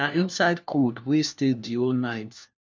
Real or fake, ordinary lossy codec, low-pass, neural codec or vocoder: fake; none; none; codec, 16 kHz, 1 kbps, FunCodec, trained on LibriTTS, 50 frames a second